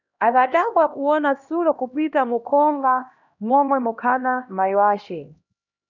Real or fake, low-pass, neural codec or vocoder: fake; 7.2 kHz; codec, 16 kHz, 1 kbps, X-Codec, HuBERT features, trained on LibriSpeech